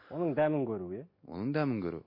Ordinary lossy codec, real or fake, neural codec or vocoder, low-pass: MP3, 32 kbps; real; none; 5.4 kHz